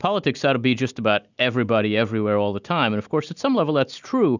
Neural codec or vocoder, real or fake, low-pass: none; real; 7.2 kHz